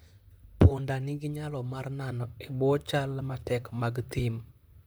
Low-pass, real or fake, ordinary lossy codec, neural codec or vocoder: none; fake; none; vocoder, 44.1 kHz, 128 mel bands, Pupu-Vocoder